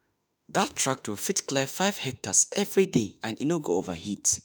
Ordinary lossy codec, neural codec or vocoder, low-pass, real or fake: none; autoencoder, 48 kHz, 32 numbers a frame, DAC-VAE, trained on Japanese speech; none; fake